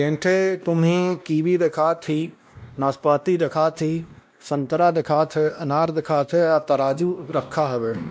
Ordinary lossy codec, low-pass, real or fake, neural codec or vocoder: none; none; fake; codec, 16 kHz, 1 kbps, X-Codec, WavLM features, trained on Multilingual LibriSpeech